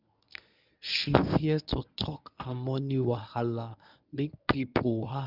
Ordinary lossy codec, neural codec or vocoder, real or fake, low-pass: none; codec, 24 kHz, 0.9 kbps, WavTokenizer, medium speech release version 1; fake; 5.4 kHz